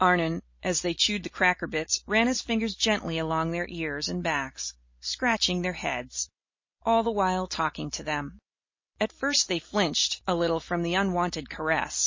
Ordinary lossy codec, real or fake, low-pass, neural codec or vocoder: MP3, 32 kbps; fake; 7.2 kHz; vocoder, 44.1 kHz, 128 mel bands every 256 samples, BigVGAN v2